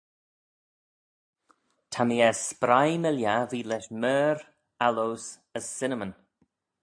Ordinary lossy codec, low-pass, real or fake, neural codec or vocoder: AAC, 64 kbps; 9.9 kHz; real; none